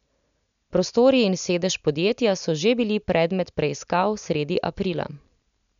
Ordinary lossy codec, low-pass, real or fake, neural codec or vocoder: none; 7.2 kHz; real; none